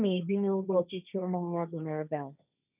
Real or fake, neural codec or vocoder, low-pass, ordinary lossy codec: fake; codec, 16 kHz, 1.1 kbps, Voila-Tokenizer; 3.6 kHz; none